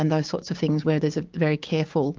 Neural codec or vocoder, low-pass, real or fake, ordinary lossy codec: codec, 16 kHz, 6 kbps, DAC; 7.2 kHz; fake; Opus, 24 kbps